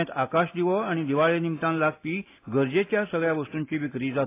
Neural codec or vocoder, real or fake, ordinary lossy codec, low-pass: none; real; AAC, 24 kbps; 3.6 kHz